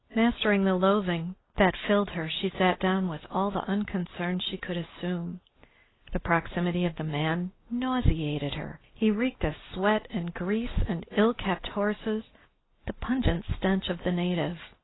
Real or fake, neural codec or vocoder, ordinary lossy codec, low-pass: real; none; AAC, 16 kbps; 7.2 kHz